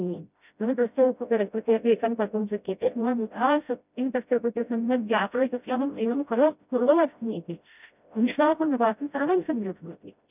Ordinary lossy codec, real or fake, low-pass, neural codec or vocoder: none; fake; 3.6 kHz; codec, 16 kHz, 0.5 kbps, FreqCodec, smaller model